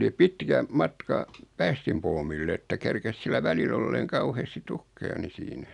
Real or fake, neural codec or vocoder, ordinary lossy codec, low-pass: real; none; none; 10.8 kHz